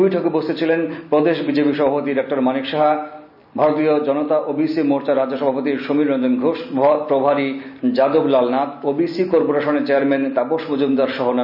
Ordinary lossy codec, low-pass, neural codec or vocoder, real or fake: none; 5.4 kHz; none; real